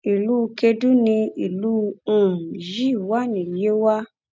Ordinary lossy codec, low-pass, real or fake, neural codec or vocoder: none; none; real; none